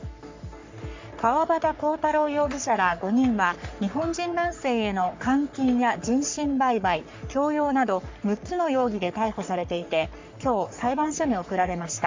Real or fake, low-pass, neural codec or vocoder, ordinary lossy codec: fake; 7.2 kHz; codec, 44.1 kHz, 3.4 kbps, Pupu-Codec; MP3, 64 kbps